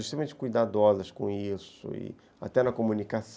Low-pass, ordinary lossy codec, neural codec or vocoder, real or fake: none; none; none; real